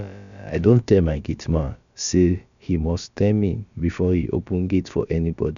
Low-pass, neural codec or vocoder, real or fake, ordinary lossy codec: 7.2 kHz; codec, 16 kHz, about 1 kbps, DyCAST, with the encoder's durations; fake; none